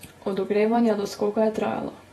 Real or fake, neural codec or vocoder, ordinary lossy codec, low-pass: fake; vocoder, 48 kHz, 128 mel bands, Vocos; AAC, 32 kbps; 19.8 kHz